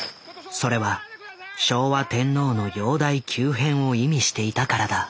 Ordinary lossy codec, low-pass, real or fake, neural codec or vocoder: none; none; real; none